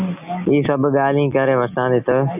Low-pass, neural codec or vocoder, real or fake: 3.6 kHz; none; real